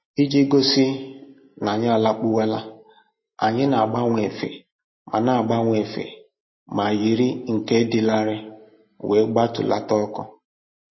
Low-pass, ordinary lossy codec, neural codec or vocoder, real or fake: 7.2 kHz; MP3, 24 kbps; none; real